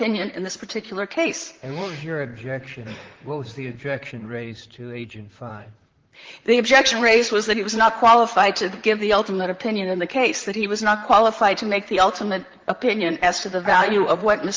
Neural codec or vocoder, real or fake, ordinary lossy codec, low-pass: vocoder, 44.1 kHz, 128 mel bands, Pupu-Vocoder; fake; Opus, 16 kbps; 7.2 kHz